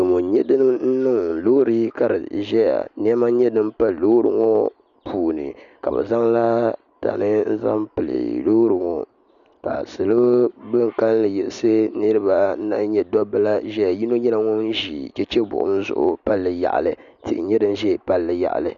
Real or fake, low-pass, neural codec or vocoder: real; 7.2 kHz; none